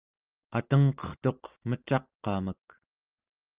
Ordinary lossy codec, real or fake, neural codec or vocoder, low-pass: Opus, 32 kbps; real; none; 3.6 kHz